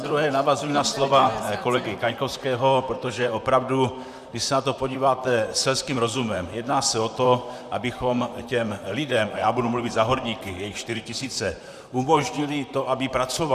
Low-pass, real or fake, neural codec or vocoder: 14.4 kHz; fake; vocoder, 44.1 kHz, 128 mel bands, Pupu-Vocoder